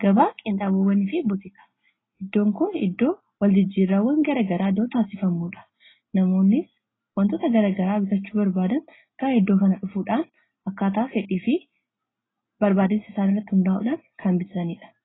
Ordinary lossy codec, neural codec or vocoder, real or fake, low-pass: AAC, 16 kbps; none; real; 7.2 kHz